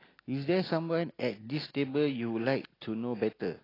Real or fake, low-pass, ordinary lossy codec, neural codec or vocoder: real; 5.4 kHz; AAC, 24 kbps; none